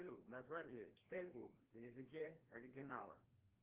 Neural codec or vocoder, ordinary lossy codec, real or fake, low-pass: codec, 16 kHz, 1 kbps, FreqCodec, larger model; Opus, 16 kbps; fake; 3.6 kHz